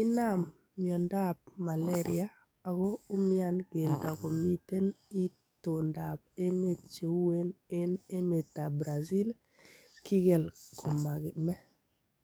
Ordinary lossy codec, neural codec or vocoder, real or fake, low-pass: none; codec, 44.1 kHz, 7.8 kbps, DAC; fake; none